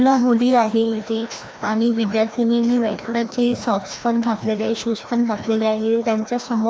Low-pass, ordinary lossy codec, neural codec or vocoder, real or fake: none; none; codec, 16 kHz, 1 kbps, FreqCodec, larger model; fake